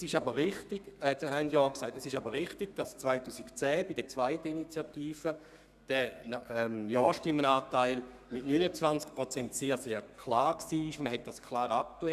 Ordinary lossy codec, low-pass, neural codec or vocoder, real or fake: none; 14.4 kHz; codec, 32 kHz, 1.9 kbps, SNAC; fake